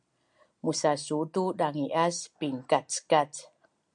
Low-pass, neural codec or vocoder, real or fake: 9.9 kHz; none; real